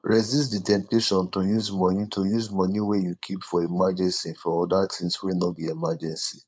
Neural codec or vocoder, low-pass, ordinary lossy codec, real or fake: codec, 16 kHz, 4.8 kbps, FACodec; none; none; fake